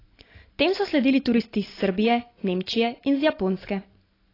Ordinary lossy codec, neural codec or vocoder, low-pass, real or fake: AAC, 24 kbps; none; 5.4 kHz; real